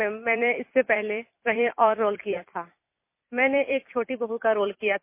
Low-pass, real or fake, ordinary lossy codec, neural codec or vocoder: 3.6 kHz; fake; MP3, 24 kbps; vocoder, 44.1 kHz, 128 mel bands every 256 samples, BigVGAN v2